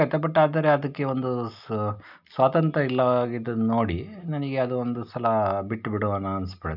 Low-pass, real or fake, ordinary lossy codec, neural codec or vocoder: 5.4 kHz; real; none; none